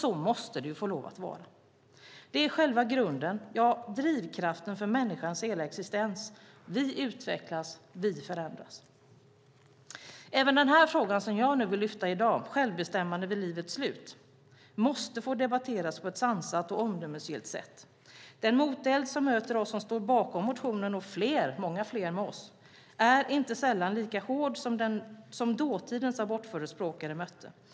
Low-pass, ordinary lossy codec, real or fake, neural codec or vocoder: none; none; real; none